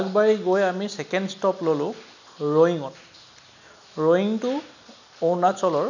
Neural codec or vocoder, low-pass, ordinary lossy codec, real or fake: none; 7.2 kHz; none; real